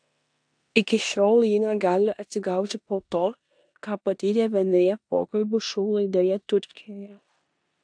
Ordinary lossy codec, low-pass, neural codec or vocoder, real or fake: AAC, 64 kbps; 9.9 kHz; codec, 16 kHz in and 24 kHz out, 0.9 kbps, LongCat-Audio-Codec, four codebook decoder; fake